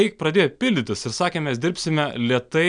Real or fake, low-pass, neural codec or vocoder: real; 9.9 kHz; none